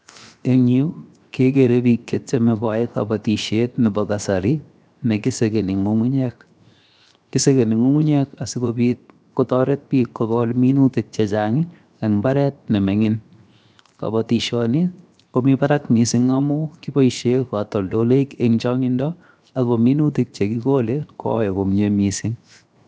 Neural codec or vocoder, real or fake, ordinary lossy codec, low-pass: codec, 16 kHz, 0.7 kbps, FocalCodec; fake; none; none